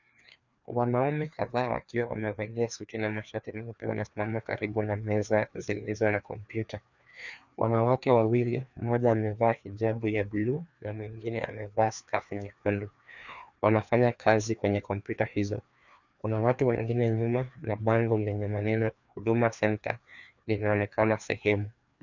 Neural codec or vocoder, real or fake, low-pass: codec, 16 kHz, 2 kbps, FreqCodec, larger model; fake; 7.2 kHz